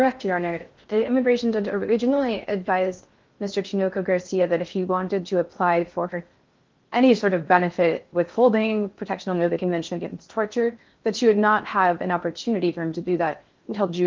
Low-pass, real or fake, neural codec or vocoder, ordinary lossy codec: 7.2 kHz; fake; codec, 16 kHz in and 24 kHz out, 0.6 kbps, FocalCodec, streaming, 4096 codes; Opus, 32 kbps